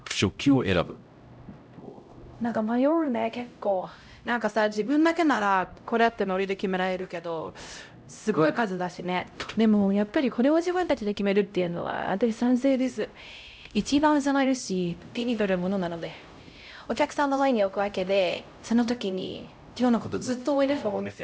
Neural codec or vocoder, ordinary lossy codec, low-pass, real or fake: codec, 16 kHz, 0.5 kbps, X-Codec, HuBERT features, trained on LibriSpeech; none; none; fake